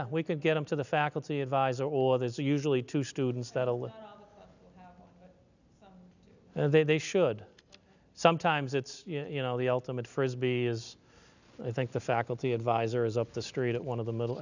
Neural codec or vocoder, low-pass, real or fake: none; 7.2 kHz; real